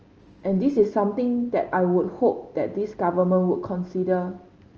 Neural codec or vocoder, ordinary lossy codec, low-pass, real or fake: none; Opus, 24 kbps; 7.2 kHz; real